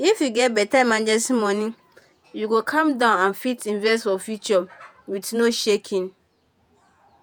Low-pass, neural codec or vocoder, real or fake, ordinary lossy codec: none; vocoder, 48 kHz, 128 mel bands, Vocos; fake; none